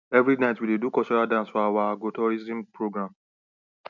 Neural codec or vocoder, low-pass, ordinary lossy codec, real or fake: none; 7.2 kHz; none; real